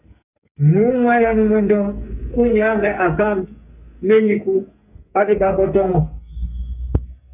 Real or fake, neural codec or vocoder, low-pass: fake; codec, 44.1 kHz, 2.6 kbps, SNAC; 3.6 kHz